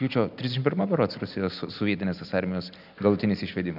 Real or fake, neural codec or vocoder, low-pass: real; none; 5.4 kHz